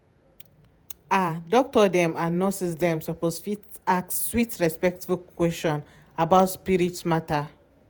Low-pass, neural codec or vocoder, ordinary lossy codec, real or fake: none; vocoder, 48 kHz, 128 mel bands, Vocos; none; fake